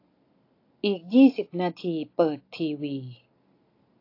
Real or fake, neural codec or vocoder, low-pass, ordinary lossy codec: real; none; 5.4 kHz; AAC, 32 kbps